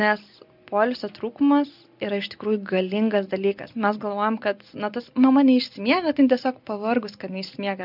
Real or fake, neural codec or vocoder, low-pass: real; none; 5.4 kHz